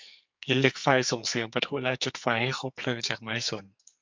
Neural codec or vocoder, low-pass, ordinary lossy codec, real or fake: codec, 44.1 kHz, 2.6 kbps, SNAC; 7.2 kHz; MP3, 64 kbps; fake